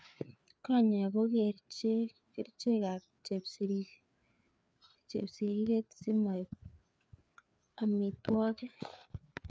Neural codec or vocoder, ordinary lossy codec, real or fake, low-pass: codec, 16 kHz, 4 kbps, FreqCodec, larger model; none; fake; none